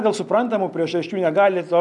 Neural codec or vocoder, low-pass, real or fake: none; 10.8 kHz; real